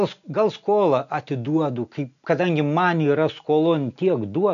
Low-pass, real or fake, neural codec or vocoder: 7.2 kHz; real; none